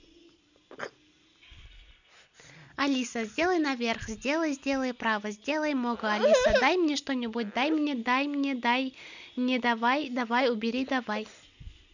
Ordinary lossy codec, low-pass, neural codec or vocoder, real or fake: none; 7.2 kHz; none; real